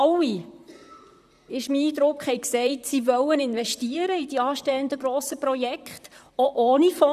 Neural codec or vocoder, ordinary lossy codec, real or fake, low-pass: vocoder, 44.1 kHz, 128 mel bands, Pupu-Vocoder; none; fake; 14.4 kHz